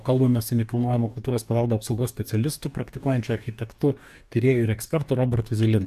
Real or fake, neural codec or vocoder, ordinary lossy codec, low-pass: fake; codec, 44.1 kHz, 2.6 kbps, DAC; AAC, 96 kbps; 14.4 kHz